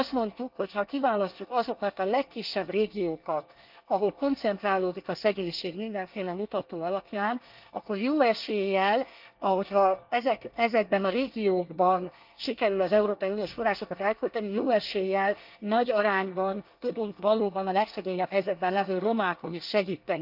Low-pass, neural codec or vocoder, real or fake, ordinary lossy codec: 5.4 kHz; codec, 24 kHz, 1 kbps, SNAC; fake; Opus, 32 kbps